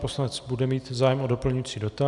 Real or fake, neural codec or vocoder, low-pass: real; none; 10.8 kHz